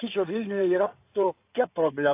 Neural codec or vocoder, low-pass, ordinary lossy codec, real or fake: codec, 16 kHz in and 24 kHz out, 2.2 kbps, FireRedTTS-2 codec; 3.6 kHz; AAC, 24 kbps; fake